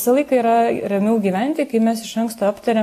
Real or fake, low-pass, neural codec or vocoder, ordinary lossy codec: real; 14.4 kHz; none; AAC, 64 kbps